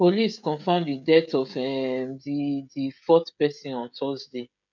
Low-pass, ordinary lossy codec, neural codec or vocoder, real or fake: 7.2 kHz; none; codec, 16 kHz, 16 kbps, FreqCodec, smaller model; fake